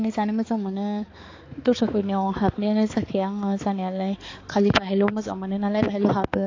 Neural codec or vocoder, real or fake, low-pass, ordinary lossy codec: codec, 16 kHz, 4 kbps, X-Codec, HuBERT features, trained on balanced general audio; fake; 7.2 kHz; AAC, 32 kbps